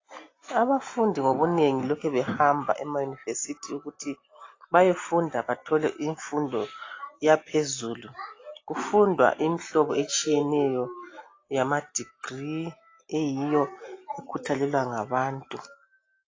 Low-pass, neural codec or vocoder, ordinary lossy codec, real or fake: 7.2 kHz; none; AAC, 32 kbps; real